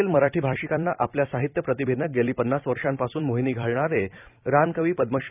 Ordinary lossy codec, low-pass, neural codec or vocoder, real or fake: none; 3.6 kHz; vocoder, 44.1 kHz, 128 mel bands every 256 samples, BigVGAN v2; fake